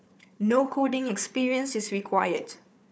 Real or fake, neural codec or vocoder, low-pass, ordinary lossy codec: fake; codec, 16 kHz, 4 kbps, FunCodec, trained on Chinese and English, 50 frames a second; none; none